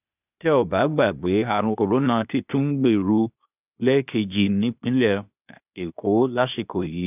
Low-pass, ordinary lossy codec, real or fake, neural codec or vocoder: 3.6 kHz; none; fake; codec, 16 kHz, 0.8 kbps, ZipCodec